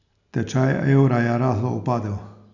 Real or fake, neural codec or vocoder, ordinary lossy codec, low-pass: real; none; none; 7.2 kHz